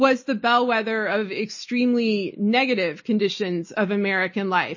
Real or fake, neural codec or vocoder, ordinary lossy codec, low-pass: real; none; MP3, 32 kbps; 7.2 kHz